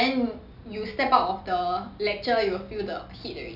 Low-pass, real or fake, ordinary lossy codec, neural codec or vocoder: 5.4 kHz; real; none; none